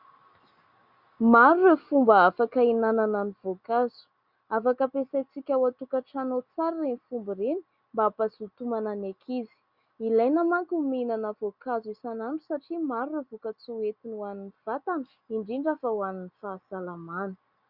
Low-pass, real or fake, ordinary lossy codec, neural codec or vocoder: 5.4 kHz; real; Opus, 64 kbps; none